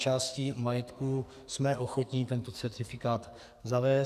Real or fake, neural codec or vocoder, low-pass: fake; codec, 44.1 kHz, 2.6 kbps, SNAC; 14.4 kHz